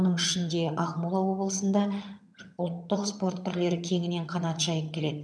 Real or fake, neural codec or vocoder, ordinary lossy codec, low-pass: fake; vocoder, 22.05 kHz, 80 mel bands, HiFi-GAN; none; none